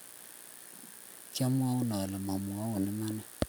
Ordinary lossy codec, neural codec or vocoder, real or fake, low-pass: none; none; real; none